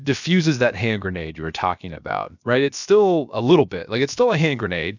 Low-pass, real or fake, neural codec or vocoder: 7.2 kHz; fake; codec, 16 kHz, about 1 kbps, DyCAST, with the encoder's durations